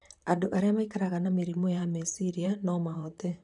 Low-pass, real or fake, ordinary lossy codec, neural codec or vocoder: 10.8 kHz; fake; none; vocoder, 44.1 kHz, 128 mel bands, Pupu-Vocoder